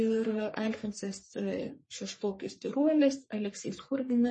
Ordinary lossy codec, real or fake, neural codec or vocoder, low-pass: MP3, 32 kbps; fake; codec, 44.1 kHz, 3.4 kbps, Pupu-Codec; 10.8 kHz